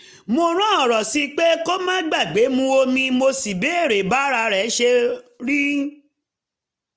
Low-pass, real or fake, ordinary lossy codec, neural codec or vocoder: none; real; none; none